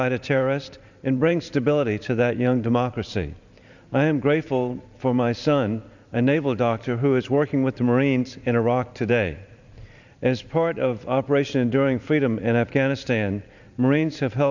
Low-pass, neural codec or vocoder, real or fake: 7.2 kHz; vocoder, 44.1 kHz, 128 mel bands every 512 samples, BigVGAN v2; fake